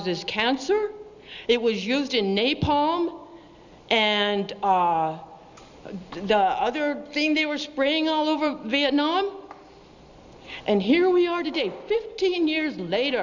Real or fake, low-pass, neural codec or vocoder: real; 7.2 kHz; none